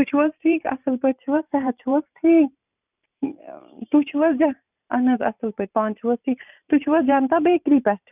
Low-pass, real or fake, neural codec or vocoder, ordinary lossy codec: 3.6 kHz; fake; vocoder, 44.1 kHz, 128 mel bands every 512 samples, BigVGAN v2; none